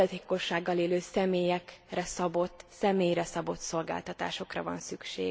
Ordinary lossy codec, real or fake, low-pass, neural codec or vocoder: none; real; none; none